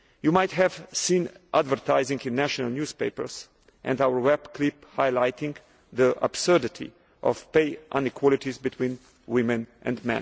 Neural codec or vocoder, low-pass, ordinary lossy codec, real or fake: none; none; none; real